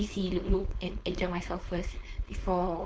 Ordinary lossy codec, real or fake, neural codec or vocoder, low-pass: none; fake; codec, 16 kHz, 4.8 kbps, FACodec; none